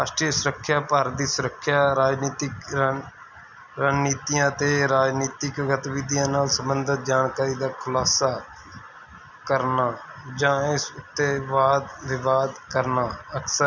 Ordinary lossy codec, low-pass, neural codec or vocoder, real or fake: none; 7.2 kHz; none; real